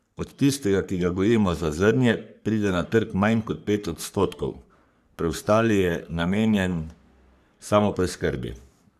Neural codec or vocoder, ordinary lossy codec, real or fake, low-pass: codec, 44.1 kHz, 3.4 kbps, Pupu-Codec; none; fake; 14.4 kHz